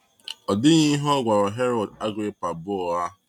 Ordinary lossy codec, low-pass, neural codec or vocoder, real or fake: none; 19.8 kHz; none; real